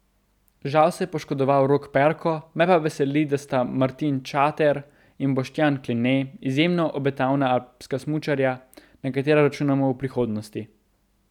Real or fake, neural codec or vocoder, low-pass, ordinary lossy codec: real; none; 19.8 kHz; none